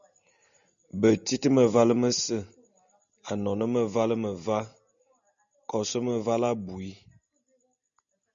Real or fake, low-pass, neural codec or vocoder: real; 7.2 kHz; none